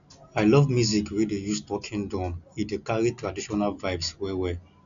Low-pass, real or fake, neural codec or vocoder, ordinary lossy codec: 7.2 kHz; real; none; none